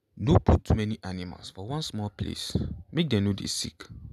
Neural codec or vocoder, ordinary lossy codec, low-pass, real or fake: none; none; 14.4 kHz; real